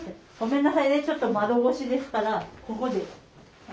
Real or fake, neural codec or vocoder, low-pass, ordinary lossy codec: real; none; none; none